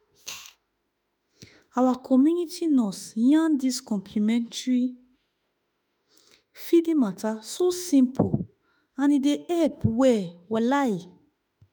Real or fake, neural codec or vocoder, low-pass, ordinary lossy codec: fake; autoencoder, 48 kHz, 32 numbers a frame, DAC-VAE, trained on Japanese speech; none; none